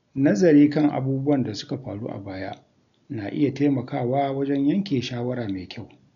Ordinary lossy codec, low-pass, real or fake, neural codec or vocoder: none; 7.2 kHz; real; none